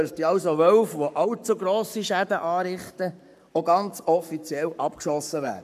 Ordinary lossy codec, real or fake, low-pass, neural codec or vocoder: none; fake; 14.4 kHz; codec, 44.1 kHz, 7.8 kbps, Pupu-Codec